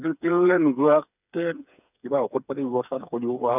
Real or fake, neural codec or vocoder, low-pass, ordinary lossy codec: fake; codec, 16 kHz, 4 kbps, FreqCodec, smaller model; 3.6 kHz; none